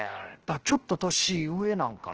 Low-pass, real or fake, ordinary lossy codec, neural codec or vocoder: 7.2 kHz; fake; Opus, 16 kbps; codec, 16 kHz, 0.7 kbps, FocalCodec